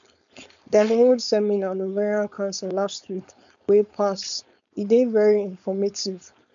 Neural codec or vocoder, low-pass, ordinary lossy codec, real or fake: codec, 16 kHz, 4.8 kbps, FACodec; 7.2 kHz; none; fake